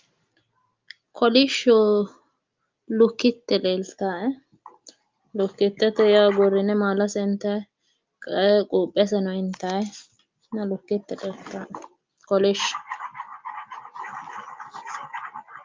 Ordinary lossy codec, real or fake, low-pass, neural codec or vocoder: Opus, 32 kbps; real; 7.2 kHz; none